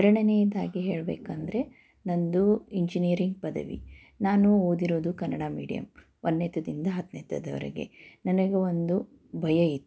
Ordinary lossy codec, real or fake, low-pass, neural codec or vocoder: none; real; none; none